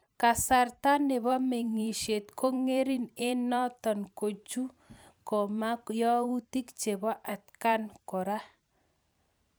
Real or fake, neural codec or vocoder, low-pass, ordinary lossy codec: fake; vocoder, 44.1 kHz, 128 mel bands every 512 samples, BigVGAN v2; none; none